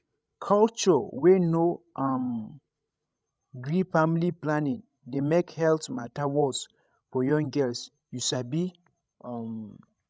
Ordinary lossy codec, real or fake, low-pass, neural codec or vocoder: none; fake; none; codec, 16 kHz, 16 kbps, FreqCodec, larger model